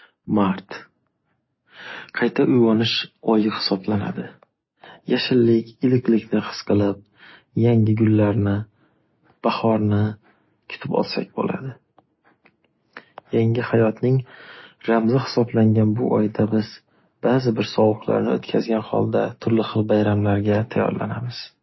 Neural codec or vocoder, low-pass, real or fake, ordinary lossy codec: none; 7.2 kHz; real; MP3, 24 kbps